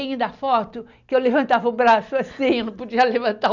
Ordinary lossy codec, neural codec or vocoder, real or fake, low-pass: none; none; real; 7.2 kHz